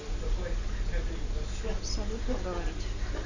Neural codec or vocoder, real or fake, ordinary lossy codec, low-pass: none; real; none; 7.2 kHz